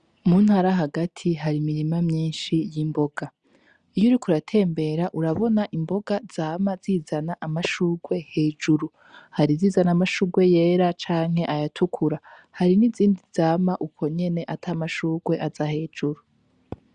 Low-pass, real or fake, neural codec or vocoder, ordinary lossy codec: 9.9 kHz; real; none; Opus, 64 kbps